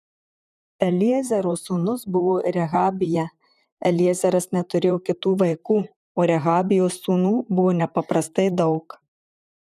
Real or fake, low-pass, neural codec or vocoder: fake; 14.4 kHz; vocoder, 44.1 kHz, 128 mel bands, Pupu-Vocoder